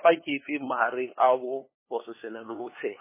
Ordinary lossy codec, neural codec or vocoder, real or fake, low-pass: MP3, 16 kbps; codec, 16 kHz, 2 kbps, FunCodec, trained on LibriTTS, 25 frames a second; fake; 3.6 kHz